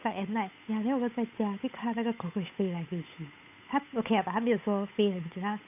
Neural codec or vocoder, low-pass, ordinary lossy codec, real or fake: codec, 16 kHz, 8 kbps, FunCodec, trained on Chinese and English, 25 frames a second; 3.6 kHz; none; fake